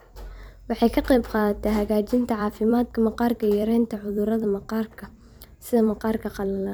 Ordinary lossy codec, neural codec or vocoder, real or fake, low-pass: none; vocoder, 44.1 kHz, 128 mel bands every 256 samples, BigVGAN v2; fake; none